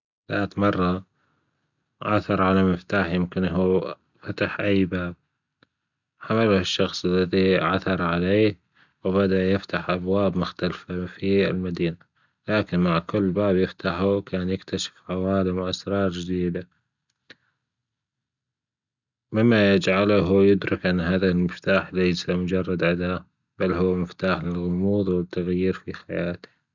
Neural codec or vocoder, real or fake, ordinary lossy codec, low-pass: none; real; none; 7.2 kHz